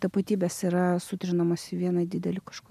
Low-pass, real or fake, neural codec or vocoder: 14.4 kHz; real; none